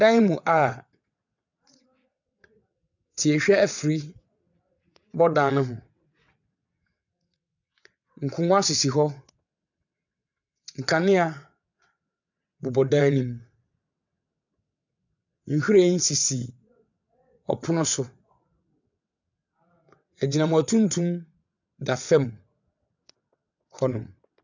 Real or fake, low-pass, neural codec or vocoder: fake; 7.2 kHz; vocoder, 22.05 kHz, 80 mel bands, WaveNeXt